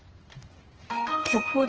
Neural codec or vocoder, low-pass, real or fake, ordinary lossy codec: codec, 16 kHz in and 24 kHz out, 1 kbps, XY-Tokenizer; 7.2 kHz; fake; Opus, 16 kbps